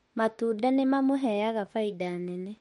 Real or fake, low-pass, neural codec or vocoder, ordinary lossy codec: fake; 19.8 kHz; autoencoder, 48 kHz, 32 numbers a frame, DAC-VAE, trained on Japanese speech; MP3, 48 kbps